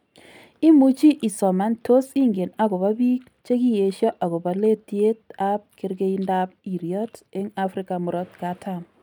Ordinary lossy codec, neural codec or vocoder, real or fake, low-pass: none; none; real; 19.8 kHz